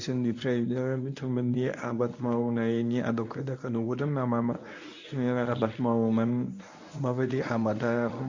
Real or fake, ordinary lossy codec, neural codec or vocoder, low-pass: fake; AAC, 48 kbps; codec, 24 kHz, 0.9 kbps, WavTokenizer, medium speech release version 1; 7.2 kHz